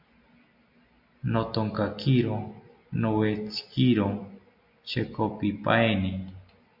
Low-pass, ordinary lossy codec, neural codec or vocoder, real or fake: 5.4 kHz; MP3, 48 kbps; none; real